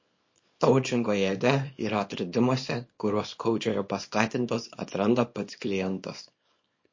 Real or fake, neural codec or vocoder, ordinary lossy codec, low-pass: fake; codec, 24 kHz, 0.9 kbps, WavTokenizer, small release; MP3, 32 kbps; 7.2 kHz